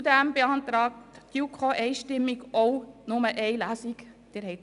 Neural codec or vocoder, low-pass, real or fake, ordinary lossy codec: none; 10.8 kHz; real; none